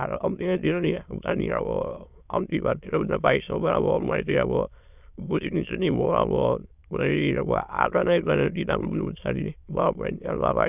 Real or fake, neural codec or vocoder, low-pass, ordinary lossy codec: fake; autoencoder, 22.05 kHz, a latent of 192 numbers a frame, VITS, trained on many speakers; 3.6 kHz; none